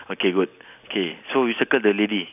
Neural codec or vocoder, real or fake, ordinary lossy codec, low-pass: none; real; none; 3.6 kHz